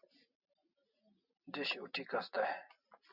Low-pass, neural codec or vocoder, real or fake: 5.4 kHz; none; real